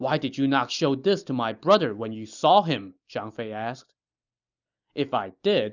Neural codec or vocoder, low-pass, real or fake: none; 7.2 kHz; real